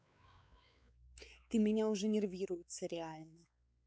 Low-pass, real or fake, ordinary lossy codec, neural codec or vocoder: none; fake; none; codec, 16 kHz, 4 kbps, X-Codec, WavLM features, trained on Multilingual LibriSpeech